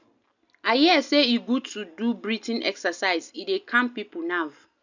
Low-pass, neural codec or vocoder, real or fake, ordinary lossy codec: 7.2 kHz; none; real; none